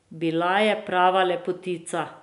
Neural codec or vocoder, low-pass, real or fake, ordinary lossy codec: none; 10.8 kHz; real; none